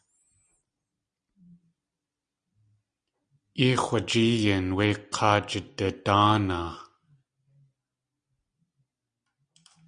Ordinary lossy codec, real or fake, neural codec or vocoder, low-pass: Opus, 64 kbps; real; none; 9.9 kHz